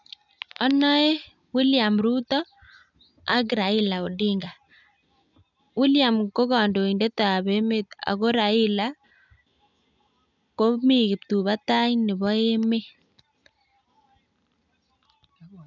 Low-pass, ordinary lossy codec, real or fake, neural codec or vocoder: 7.2 kHz; none; real; none